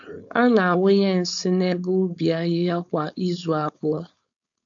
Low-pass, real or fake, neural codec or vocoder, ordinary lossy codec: 7.2 kHz; fake; codec, 16 kHz, 4.8 kbps, FACodec; AAC, 64 kbps